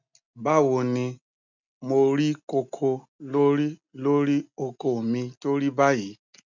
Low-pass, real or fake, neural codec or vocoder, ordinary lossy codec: 7.2 kHz; real; none; none